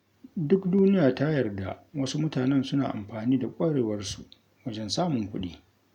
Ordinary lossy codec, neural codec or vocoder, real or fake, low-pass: none; none; real; 19.8 kHz